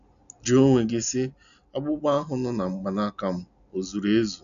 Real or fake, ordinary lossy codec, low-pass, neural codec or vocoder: real; none; 7.2 kHz; none